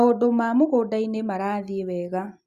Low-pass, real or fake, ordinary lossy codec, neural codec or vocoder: 14.4 kHz; real; Opus, 64 kbps; none